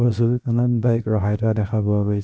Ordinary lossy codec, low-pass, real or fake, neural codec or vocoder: none; none; fake; codec, 16 kHz, 0.7 kbps, FocalCodec